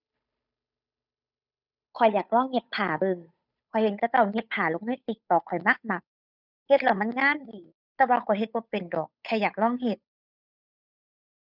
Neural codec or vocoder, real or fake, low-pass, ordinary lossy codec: codec, 16 kHz, 8 kbps, FunCodec, trained on Chinese and English, 25 frames a second; fake; 5.4 kHz; none